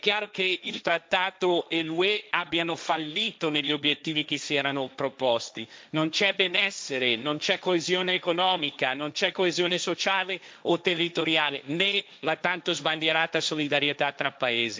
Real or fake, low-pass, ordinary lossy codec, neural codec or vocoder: fake; none; none; codec, 16 kHz, 1.1 kbps, Voila-Tokenizer